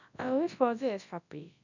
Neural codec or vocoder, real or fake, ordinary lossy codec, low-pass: codec, 24 kHz, 0.9 kbps, WavTokenizer, large speech release; fake; none; 7.2 kHz